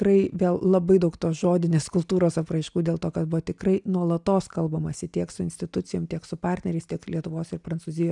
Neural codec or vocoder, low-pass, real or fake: none; 10.8 kHz; real